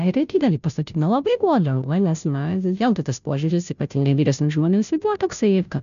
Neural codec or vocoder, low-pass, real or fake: codec, 16 kHz, 0.5 kbps, FunCodec, trained on Chinese and English, 25 frames a second; 7.2 kHz; fake